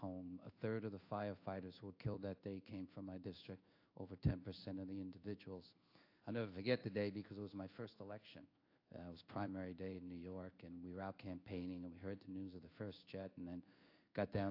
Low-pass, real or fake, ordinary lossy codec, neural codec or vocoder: 5.4 kHz; fake; AAC, 32 kbps; codec, 16 kHz in and 24 kHz out, 1 kbps, XY-Tokenizer